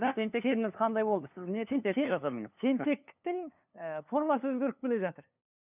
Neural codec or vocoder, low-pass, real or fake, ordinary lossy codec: codec, 16 kHz, 2 kbps, FunCodec, trained on LibriTTS, 25 frames a second; 3.6 kHz; fake; none